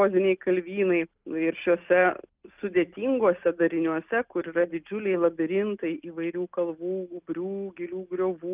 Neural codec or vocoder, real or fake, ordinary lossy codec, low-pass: none; real; Opus, 24 kbps; 3.6 kHz